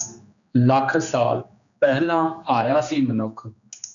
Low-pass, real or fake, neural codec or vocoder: 7.2 kHz; fake; codec, 16 kHz, 2 kbps, X-Codec, HuBERT features, trained on general audio